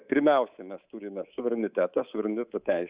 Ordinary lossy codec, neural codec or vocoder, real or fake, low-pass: Opus, 64 kbps; codec, 24 kHz, 3.1 kbps, DualCodec; fake; 3.6 kHz